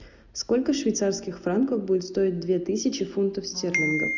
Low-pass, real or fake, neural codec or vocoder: 7.2 kHz; real; none